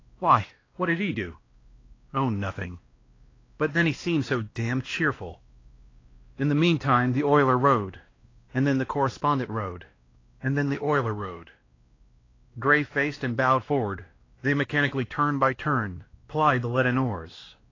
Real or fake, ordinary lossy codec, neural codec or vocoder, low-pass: fake; AAC, 32 kbps; codec, 16 kHz, 1 kbps, X-Codec, WavLM features, trained on Multilingual LibriSpeech; 7.2 kHz